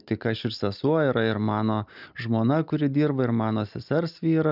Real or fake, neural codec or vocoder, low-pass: real; none; 5.4 kHz